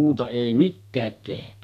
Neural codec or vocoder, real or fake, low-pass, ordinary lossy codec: codec, 32 kHz, 1.9 kbps, SNAC; fake; 14.4 kHz; none